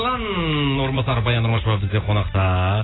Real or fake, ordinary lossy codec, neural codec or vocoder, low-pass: real; AAC, 16 kbps; none; 7.2 kHz